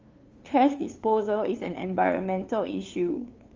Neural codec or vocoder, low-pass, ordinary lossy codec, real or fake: codec, 16 kHz, 2 kbps, FunCodec, trained on LibriTTS, 25 frames a second; 7.2 kHz; Opus, 32 kbps; fake